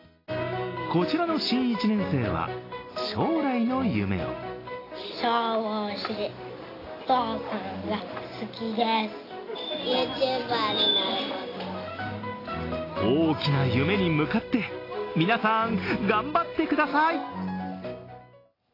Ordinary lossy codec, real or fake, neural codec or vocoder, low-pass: AAC, 24 kbps; real; none; 5.4 kHz